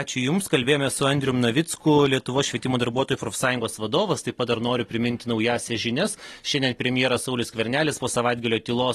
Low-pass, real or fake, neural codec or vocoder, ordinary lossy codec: 19.8 kHz; real; none; AAC, 32 kbps